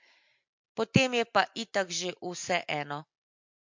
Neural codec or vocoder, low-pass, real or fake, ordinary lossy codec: none; 7.2 kHz; real; MP3, 48 kbps